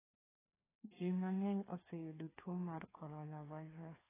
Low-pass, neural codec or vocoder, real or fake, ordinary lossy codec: 3.6 kHz; codec, 16 kHz, 2 kbps, FreqCodec, larger model; fake; AAC, 16 kbps